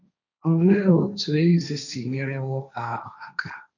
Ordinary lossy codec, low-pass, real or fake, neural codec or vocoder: none; 7.2 kHz; fake; codec, 16 kHz, 1.1 kbps, Voila-Tokenizer